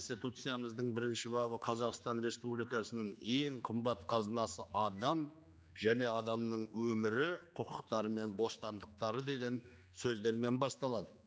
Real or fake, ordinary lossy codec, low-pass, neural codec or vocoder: fake; none; none; codec, 16 kHz, 2 kbps, X-Codec, HuBERT features, trained on general audio